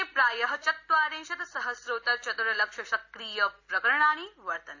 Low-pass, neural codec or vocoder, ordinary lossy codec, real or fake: 7.2 kHz; none; AAC, 48 kbps; real